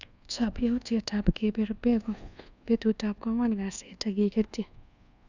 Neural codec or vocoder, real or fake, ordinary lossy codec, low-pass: codec, 24 kHz, 1.2 kbps, DualCodec; fake; none; 7.2 kHz